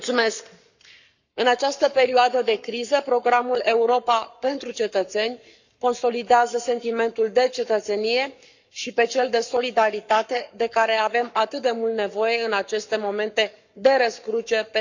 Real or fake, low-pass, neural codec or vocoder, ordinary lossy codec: fake; 7.2 kHz; codec, 44.1 kHz, 7.8 kbps, Pupu-Codec; none